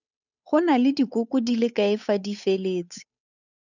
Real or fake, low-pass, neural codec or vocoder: fake; 7.2 kHz; codec, 16 kHz, 8 kbps, FunCodec, trained on Chinese and English, 25 frames a second